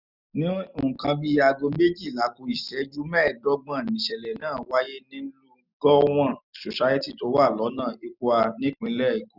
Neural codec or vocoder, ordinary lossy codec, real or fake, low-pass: none; none; real; 5.4 kHz